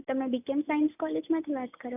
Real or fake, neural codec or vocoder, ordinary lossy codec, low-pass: real; none; none; 3.6 kHz